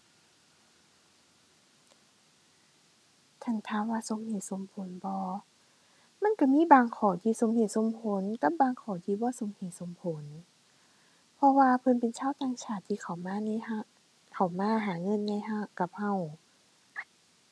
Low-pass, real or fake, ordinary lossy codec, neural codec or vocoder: none; real; none; none